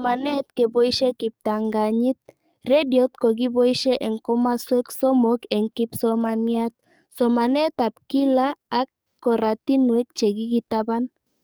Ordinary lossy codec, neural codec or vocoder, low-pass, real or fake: none; codec, 44.1 kHz, 7.8 kbps, DAC; none; fake